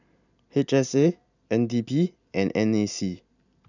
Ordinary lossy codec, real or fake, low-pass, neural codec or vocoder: none; fake; 7.2 kHz; vocoder, 44.1 kHz, 128 mel bands every 256 samples, BigVGAN v2